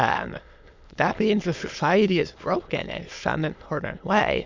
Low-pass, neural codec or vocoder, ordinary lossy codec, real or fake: 7.2 kHz; autoencoder, 22.05 kHz, a latent of 192 numbers a frame, VITS, trained on many speakers; MP3, 64 kbps; fake